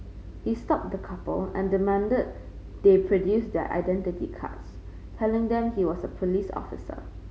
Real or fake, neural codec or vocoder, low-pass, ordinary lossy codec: real; none; none; none